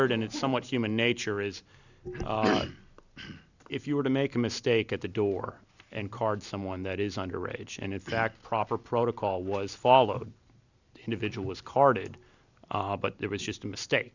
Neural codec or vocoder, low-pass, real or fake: none; 7.2 kHz; real